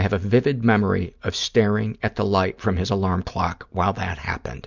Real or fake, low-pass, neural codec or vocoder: real; 7.2 kHz; none